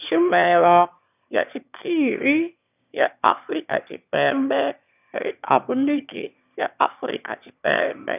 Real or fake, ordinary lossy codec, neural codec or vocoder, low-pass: fake; none; autoencoder, 22.05 kHz, a latent of 192 numbers a frame, VITS, trained on one speaker; 3.6 kHz